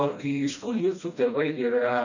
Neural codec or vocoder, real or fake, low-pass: codec, 16 kHz, 1 kbps, FreqCodec, smaller model; fake; 7.2 kHz